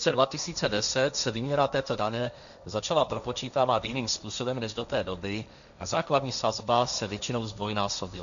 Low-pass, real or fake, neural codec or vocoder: 7.2 kHz; fake; codec, 16 kHz, 1.1 kbps, Voila-Tokenizer